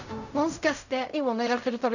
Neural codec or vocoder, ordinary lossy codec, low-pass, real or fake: codec, 16 kHz in and 24 kHz out, 0.4 kbps, LongCat-Audio-Codec, fine tuned four codebook decoder; none; 7.2 kHz; fake